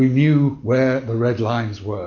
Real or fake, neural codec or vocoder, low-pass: real; none; 7.2 kHz